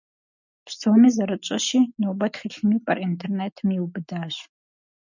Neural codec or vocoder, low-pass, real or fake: none; 7.2 kHz; real